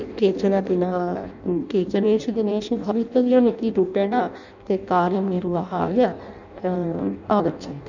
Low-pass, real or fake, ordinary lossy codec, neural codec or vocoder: 7.2 kHz; fake; none; codec, 16 kHz in and 24 kHz out, 0.6 kbps, FireRedTTS-2 codec